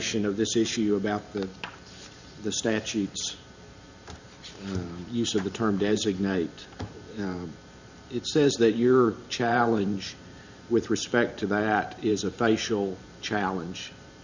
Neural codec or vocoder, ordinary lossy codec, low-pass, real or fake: vocoder, 44.1 kHz, 128 mel bands every 512 samples, BigVGAN v2; Opus, 64 kbps; 7.2 kHz; fake